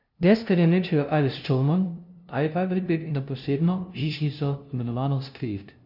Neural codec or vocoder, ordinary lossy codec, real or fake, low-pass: codec, 16 kHz, 0.5 kbps, FunCodec, trained on LibriTTS, 25 frames a second; none; fake; 5.4 kHz